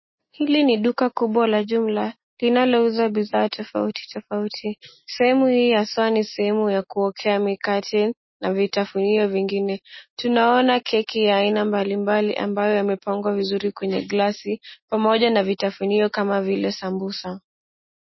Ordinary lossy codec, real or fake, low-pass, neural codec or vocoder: MP3, 24 kbps; real; 7.2 kHz; none